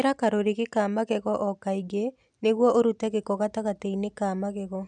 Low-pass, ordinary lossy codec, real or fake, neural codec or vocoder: 9.9 kHz; none; real; none